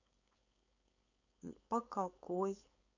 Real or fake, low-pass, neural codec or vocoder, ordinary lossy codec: fake; 7.2 kHz; codec, 16 kHz, 4.8 kbps, FACodec; none